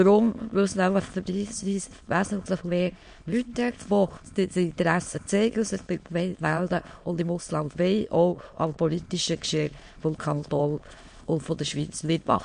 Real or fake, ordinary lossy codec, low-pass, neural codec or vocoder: fake; MP3, 48 kbps; 9.9 kHz; autoencoder, 22.05 kHz, a latent of 192 numbers a frame, VITS, trained on many speakers